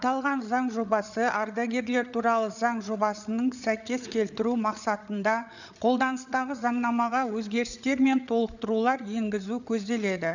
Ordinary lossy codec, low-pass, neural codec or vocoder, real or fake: none; 7.2 kHz; codec, 16 kHz, 8 kbps, FreqCodec, larger model; fake